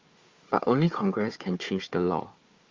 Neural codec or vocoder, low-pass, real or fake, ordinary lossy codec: codec, 16 kHz, 4 kbps, FunCodec, trained on Chinese and English, 50 frames a second; 7.2 kHz; fake; Opus, 32 kbps